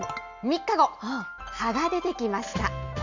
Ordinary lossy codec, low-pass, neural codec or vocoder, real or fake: Opus, 64 kbps; 7.2 kHz; none; real